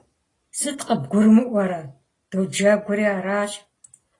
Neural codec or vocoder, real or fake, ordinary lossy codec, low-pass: none; real; AAC, 32 kbps; 10.8 kHz